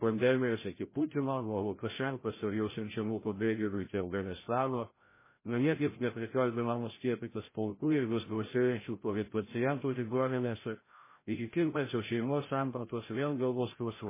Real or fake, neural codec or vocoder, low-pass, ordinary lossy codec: fake; codec, 16 kHz, 0.5 kbps, FreqCodec, larger model; 3.6 kHz; MP3, 16 kbps